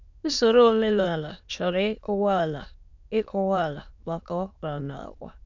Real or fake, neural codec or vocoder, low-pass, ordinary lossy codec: fake; autoencoder, 22.05 kHz, a latent of 192 numbers a frame, VITS, trained on many speakers; 7.2 kHz; none